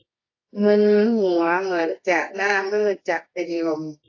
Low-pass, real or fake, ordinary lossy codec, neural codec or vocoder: 7.2 kHz; fake; AAC, 32 kbps; codec, 24 kHz, 0.9 kbps, WavTokenizer, medium music audio release